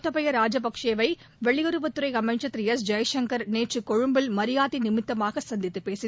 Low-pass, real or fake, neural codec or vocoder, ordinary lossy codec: none; real; none; none